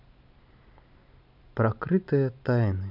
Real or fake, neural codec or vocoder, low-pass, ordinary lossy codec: real; none; 5.4 kHz; none